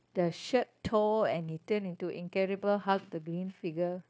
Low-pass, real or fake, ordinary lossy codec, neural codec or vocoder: none; fake; none; codec, 16 kHz, 0.9 kbps, LongCat-Audio-Codec